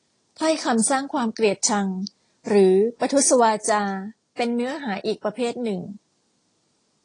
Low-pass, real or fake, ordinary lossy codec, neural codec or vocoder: 9.9 kHz; real; AAC, 32 kbps; none